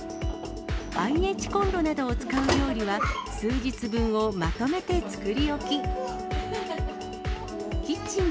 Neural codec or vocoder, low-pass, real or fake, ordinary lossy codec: none; none; real; none